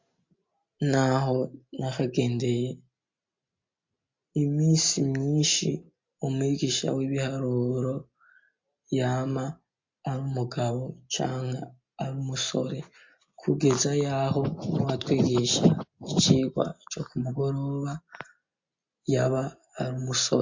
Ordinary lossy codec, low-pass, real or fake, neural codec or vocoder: MP3, 48 kbps; 7.2 kHz; real; none